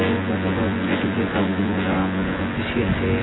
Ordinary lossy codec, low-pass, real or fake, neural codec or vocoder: AAC, 16 kbps; 7.2 kHz; fake; vocoder, 24 kHz, 100 mel bands, Vocos